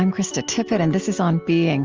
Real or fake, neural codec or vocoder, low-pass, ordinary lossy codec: real; none; 7.2 kHz; Opus, 24 kbps